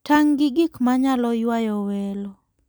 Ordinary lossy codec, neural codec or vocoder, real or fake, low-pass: none; none; real; none